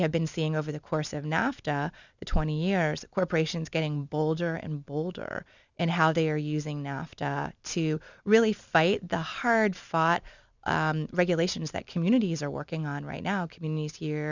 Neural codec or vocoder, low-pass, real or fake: none; 7.2 kHz; real